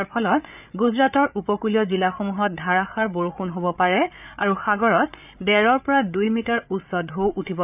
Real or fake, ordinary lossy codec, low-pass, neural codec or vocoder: fake; none; 3.6 kHz; codec, 16 kHz, 8 kbps, FreqCodec, larger model